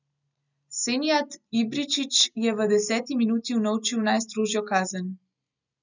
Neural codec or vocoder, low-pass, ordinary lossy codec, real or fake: none; 7.2 kHz; none; real